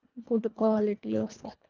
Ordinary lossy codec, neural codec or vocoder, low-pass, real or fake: Opus, 24 kbps; codec, 24 kHz, 1.5 kbps, HILCodec; 7.2 kHz; fake